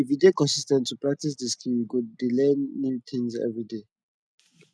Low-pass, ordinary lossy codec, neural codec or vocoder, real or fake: none; none; none; real